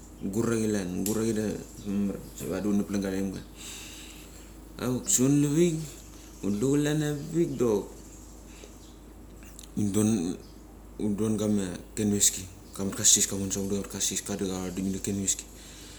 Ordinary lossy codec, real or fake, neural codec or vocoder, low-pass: none; real; none; none